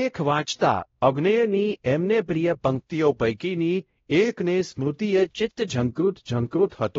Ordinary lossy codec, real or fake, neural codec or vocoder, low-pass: AAC, 24 kbps; fake; codec, 16 kHz, 0.5 kbps, X-Codec, WavLM features, trained on Multilingual LibriSpeech; 7.2 kHz